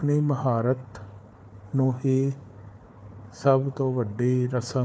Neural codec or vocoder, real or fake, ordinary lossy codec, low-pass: codec, 16 kHz, 4 kbps, FunCodec, trained on Chinese and English, 50 frames a second; fake; none; none